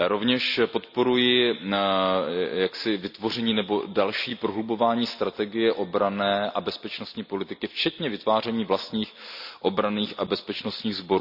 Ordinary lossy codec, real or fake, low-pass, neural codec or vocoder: none; real; 5.4 kHz; none